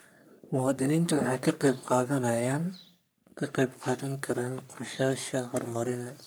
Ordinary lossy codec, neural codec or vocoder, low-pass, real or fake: none; codec, 44.1 kHz, 3.4 kbps, Pupu-Codec; none; fake